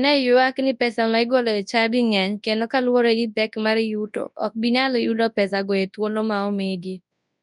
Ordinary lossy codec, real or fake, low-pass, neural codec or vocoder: none; fake; 10.8 kHz; codec, 24 kHz, 0.9 kbps, WavTokenizer, large speech release